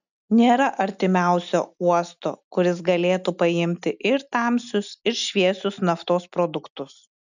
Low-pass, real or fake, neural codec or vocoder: 7.2 kHz; real; none